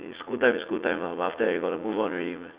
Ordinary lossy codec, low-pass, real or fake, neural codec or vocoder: Opus, 64 kbps; 3.6 kHz; fake; vocoder, 44.1 kHz, 80 mel bands, Vocos